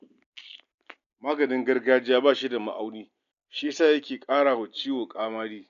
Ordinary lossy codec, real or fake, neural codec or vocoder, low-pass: none; real; none; 7.2 kHz